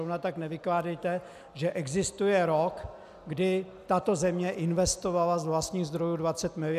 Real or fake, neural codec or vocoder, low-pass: real; none; 14.4 kHz